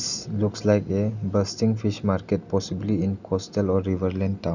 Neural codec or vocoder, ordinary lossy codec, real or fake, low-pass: none; none; real; 7.2 kHz